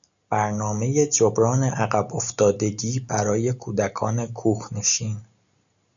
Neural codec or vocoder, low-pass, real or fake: none; 7.2 kHz; real